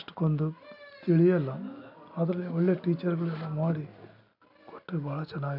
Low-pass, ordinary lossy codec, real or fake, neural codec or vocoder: 5.4 kHz; none; real; none